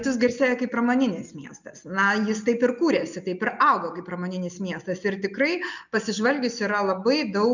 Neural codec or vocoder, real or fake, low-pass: none; real; 7.2 kHz